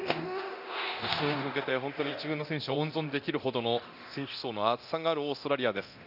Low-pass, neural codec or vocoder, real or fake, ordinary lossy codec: 5.4 kHz; codec, 24 kHz, 0.9 kbps, DualCodec; fake; none